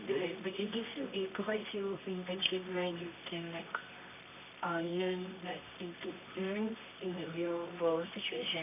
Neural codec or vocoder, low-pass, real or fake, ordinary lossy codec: codec, 24 kHz, 0.9 kbps, WavTokenizer, medium music audio release; 3.6 kHz; fake; none